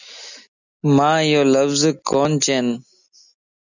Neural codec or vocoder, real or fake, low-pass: none; real; 7.2 kHz